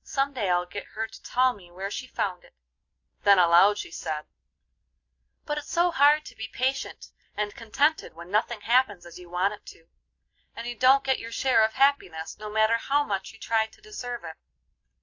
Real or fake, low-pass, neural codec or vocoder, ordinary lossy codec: real; 7.2 kHz; none; AAC, 48 kbps